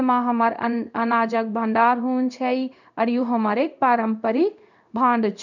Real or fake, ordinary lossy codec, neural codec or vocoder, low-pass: fake; none; codec, 16 kHz in and 24 kHz out, 1 kbps, XY-Tokenizer; 7.2 kHz